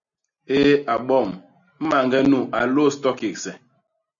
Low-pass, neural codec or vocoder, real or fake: 7.2 kHz; none; real